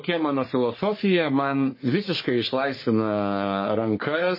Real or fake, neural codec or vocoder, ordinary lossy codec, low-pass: fake; codec, 44.1 kHz, 3.4 kbps, Pupu-Codec; MP3, 24 kbps; 5.4 kHz